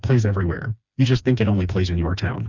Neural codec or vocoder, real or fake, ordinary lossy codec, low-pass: codec, 16 kHz, 2 kbps, FreqCodec, smaller model; fake; Opus, 64 kbps; 7.2 kHz